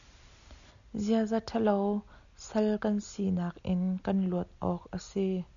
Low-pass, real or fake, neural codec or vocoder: 7.2 kHz; real; none